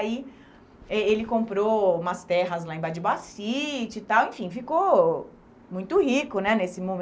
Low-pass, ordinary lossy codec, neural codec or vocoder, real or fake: none; none; none; real